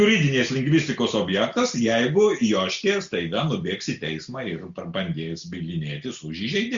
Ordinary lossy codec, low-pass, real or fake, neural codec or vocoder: Opus, 64 kbps; 7.2 kHz; real; none